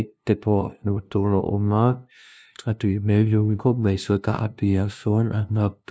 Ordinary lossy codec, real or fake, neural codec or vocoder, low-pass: none; fake; codec, 16 kHz, 0.5 kbps, FunCodec, trained on LibriTTS, 25 frames a second; none